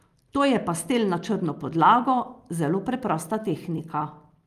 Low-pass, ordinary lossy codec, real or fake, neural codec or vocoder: 14.4 kHz; Opus, 32 kbps; real; none